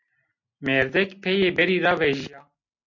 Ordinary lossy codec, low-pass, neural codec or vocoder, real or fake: MP3, 48 kbps; 7.2 kHz; none; real